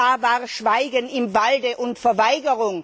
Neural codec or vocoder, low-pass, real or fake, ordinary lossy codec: none; none; real; none